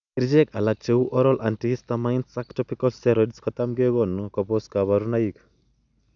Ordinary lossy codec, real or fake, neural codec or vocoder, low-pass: none; real; none; 7.2 kHz